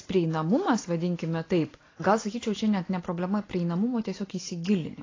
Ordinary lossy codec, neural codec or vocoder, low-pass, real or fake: AAC, 32 kbps; none; 7.2 kHz; real